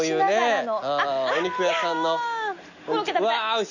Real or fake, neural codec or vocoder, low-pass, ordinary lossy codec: real; none; 7.2 kHz; none